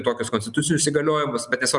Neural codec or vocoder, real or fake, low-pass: none; real; 10.8 kHz